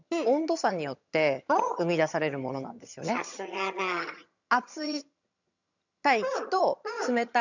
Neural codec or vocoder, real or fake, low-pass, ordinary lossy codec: vocoder, 22.05 kHz, 80 mel bands, HiFi-GAN; fake; 7.2 kHz; none